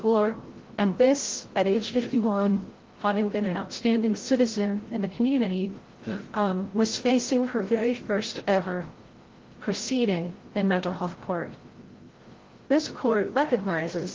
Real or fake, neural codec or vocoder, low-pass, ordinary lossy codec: fake; codec, 16 kHz, 0.5 kbps, FreqCodec, larger model; 7.2 kHz; Opus, 16 kbps